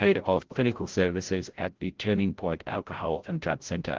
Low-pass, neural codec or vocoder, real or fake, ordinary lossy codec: 7.2 kHz; codec, 16 kHz, 0.5 kbps, FreqCodec, larger model; fake; Opus, 16 kbps